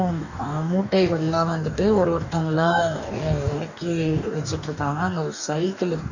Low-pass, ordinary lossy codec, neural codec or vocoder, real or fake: 7.2 kHz; none; codec, 44.1 kHz, 2.6 kbps, DAC; fake